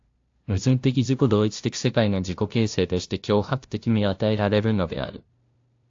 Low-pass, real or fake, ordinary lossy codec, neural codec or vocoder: 7.2 kHz; fake; AAC, 48 kbps; codec, 16 kHz, 0.5 kbps, FunCodec, trained on LibriTTS, 25 frames a second